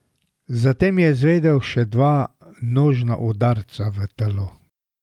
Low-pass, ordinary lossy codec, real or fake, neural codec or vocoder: 19.8 kHz; Opus, 32 kbps; real; none